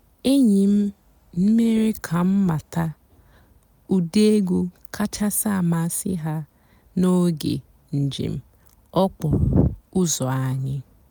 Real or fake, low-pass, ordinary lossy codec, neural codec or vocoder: real; none; none; none